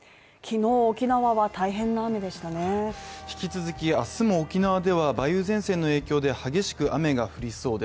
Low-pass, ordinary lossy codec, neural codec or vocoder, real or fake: none; none; none; real